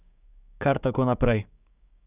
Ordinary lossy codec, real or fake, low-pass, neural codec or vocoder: none; fake; 3.6 kHz; codec, 16 kHz, 6 kbps, DAC